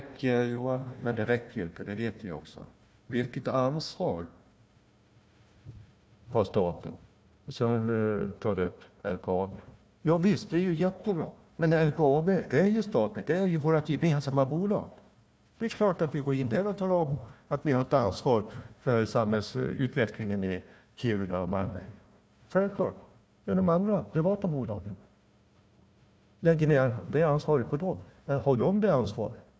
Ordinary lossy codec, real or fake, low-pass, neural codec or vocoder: none; fake; none; codec, 16 kHz, 1 kbps, FunCodec, trained on Chinese and English, 50 frames a second